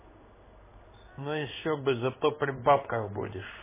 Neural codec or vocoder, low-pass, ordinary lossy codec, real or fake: codec, 16 kHz in and 24 kHz out, 1 kbps, XY-Tokenizer; 3.6 kHz; MP3, 16 kbps; fake